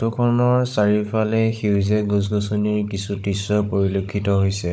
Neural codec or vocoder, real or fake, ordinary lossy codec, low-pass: codec, 16 kHz, 16 kbps, FunCodec, trained on Chinese and English, 50 frames a second; fake; none; none